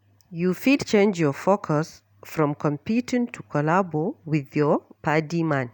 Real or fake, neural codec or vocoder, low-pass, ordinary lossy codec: real; none; 19.8 kHz; none